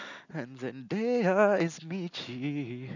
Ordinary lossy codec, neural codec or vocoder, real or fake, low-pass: none; none; real; 7.2 kHz